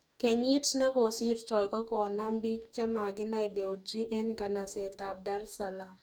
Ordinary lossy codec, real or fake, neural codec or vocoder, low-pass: none; fake; codec, 44.1 kHz, 2.6 kbps, DAC; none